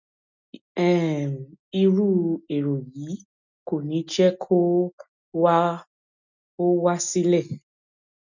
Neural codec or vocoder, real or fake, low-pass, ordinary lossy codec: none; real; 7.2 kHz; none